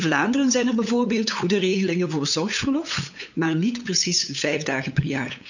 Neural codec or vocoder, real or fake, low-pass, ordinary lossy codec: codec, 16 kHz, 8 kbps, FunCodec, trained on LibriTTS, 25 frames a second; fake; 7.2 kHz; none